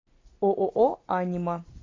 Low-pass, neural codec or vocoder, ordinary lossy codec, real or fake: 7.2 kHz; none; AAC, 32 kbps; real